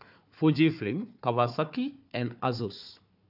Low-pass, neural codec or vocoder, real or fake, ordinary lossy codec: 5.4 kHz; codec, 16 kHz, 4 kbps, FunCodec, trained on Chinese and English, 50 frames a second; fake; AAC, 48 kbps